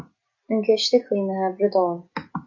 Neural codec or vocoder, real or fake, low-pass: none; real; 7.2 kHz